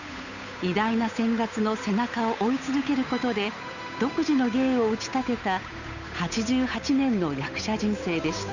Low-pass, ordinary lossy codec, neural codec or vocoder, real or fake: 7.2 kHz; none; codec, 16 kHz, 8 kbps, FunCodec, trained on Chinese and English, 25 frames a second; fake